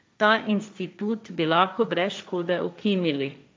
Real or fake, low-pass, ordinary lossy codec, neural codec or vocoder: fake; none; none; codec, 16 kHz, 1.1 kbps, Voila-Tokenizer